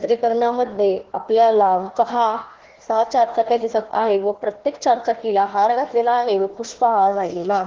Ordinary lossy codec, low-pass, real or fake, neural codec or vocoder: Opus, 16 kbps; 7.2 kHz; fake; codec, 16 kHz, 1 kbps, FunCodec, trained on Chinese and English, 50 frames a second